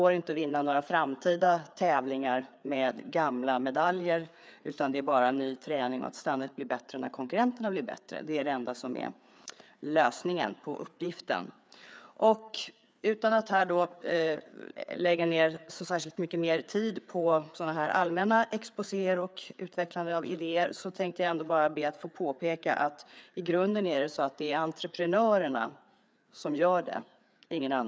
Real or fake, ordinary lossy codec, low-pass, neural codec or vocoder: fake; none; none; codec, 16 kHz, 4 kbps, FreqCodec, larger model